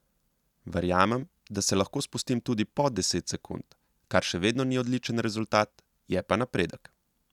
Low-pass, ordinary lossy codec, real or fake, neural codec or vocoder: 19.8 kHz; none; real; none